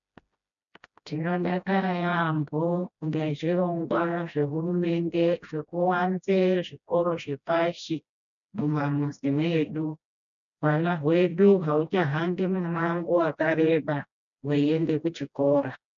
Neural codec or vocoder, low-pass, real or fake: codec, 16 kHz, 1 kbps, FreqCodec, smaller model; 7.2 kHz; fake